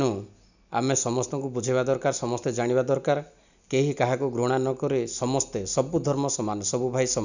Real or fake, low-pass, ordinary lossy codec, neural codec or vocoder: real; 7.2 kHz; none; none